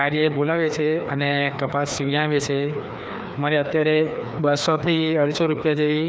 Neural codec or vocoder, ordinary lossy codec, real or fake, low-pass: codec, 16 kHz, 2 kbps, FreqCodec, larger model; none; fake; none